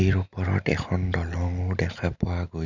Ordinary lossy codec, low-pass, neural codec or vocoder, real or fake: none; 7.2 kHz; none; real